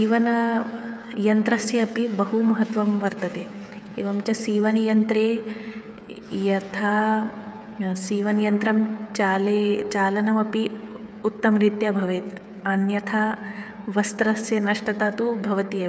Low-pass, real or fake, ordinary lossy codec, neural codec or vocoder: none; fake; none; codec, 16 kHz, 8 kbps, FreqCodec, smaller model